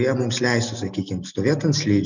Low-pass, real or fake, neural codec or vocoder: 7.2 kHz; real; none